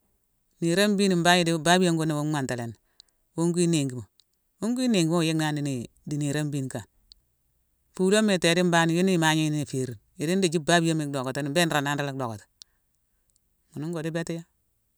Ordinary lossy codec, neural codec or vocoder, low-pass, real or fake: none; none; none; real